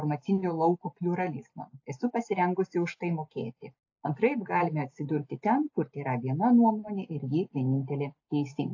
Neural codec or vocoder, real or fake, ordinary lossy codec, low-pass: none; real; AAC, 48 kbps; 7.2 kHz